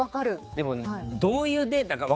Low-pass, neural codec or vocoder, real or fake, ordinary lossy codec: none; codec, 16 kHz, 4 kbps, X-Codec, HuBERT features, trained on general audio; fake; none